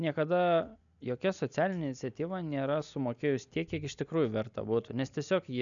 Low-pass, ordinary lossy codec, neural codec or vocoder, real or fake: 7.2 kHz; MP3, 96 kbps; none; real